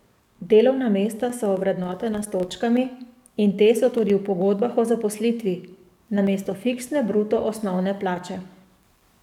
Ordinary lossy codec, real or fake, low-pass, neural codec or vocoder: none; fake; 19.8 kHz; codec, 44.1 kHz, 7.8 kbps, DAC